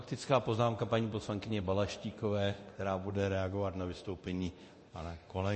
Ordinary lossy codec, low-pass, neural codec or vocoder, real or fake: MP3, 32 kbps; 10.8 kHz; codec, 24 kHz, 0.9 kbps, DualCodec; fake